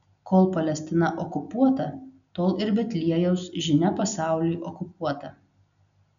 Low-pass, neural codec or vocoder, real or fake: 7.2 kHz; none; real